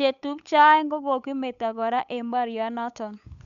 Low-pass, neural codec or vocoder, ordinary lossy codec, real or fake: 7.2 kHz; codec, 16 kHz, 8 kbps, FunCodec, trained on Chinese and English, 25 frames a second; none; fake